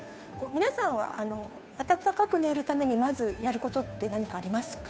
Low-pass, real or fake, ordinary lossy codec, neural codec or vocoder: none; fake; none; codec, 16 kHz, 2 kbps, FunCodec, trained on Chinese and English, 25 frames a second